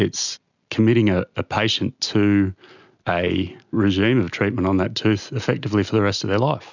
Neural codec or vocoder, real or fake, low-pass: none; real; 7.2 kHz